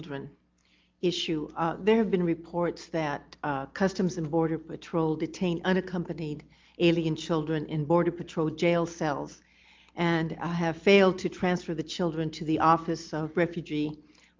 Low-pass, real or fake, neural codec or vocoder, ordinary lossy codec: 7.2 kHz; real; none; Opus, 24 kbps